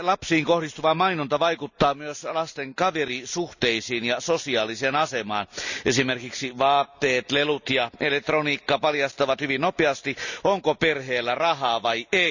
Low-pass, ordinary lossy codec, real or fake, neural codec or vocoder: 7.2 kHz; none; real; none